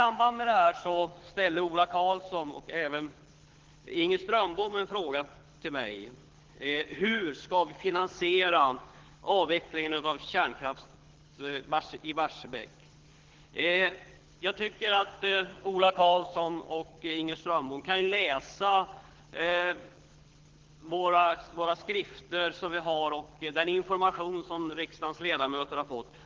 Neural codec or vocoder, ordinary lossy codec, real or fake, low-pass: codec, 24 kHz, 6 kbps, HILCodec; Opus, 16 kbps; fake; 7.2 kHz